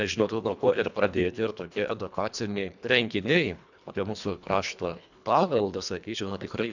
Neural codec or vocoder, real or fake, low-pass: codec, 24 kHz, 1.5 kbps, HILCodec; fake; 7.2 kHz